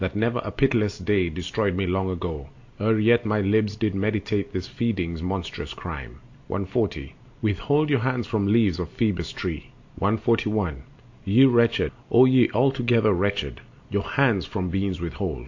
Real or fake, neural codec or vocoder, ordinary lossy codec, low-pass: real; none; AAC, 48 kbps; 7.2 kHz